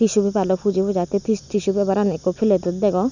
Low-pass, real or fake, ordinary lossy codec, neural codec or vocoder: 7.2 kHz; real; none; none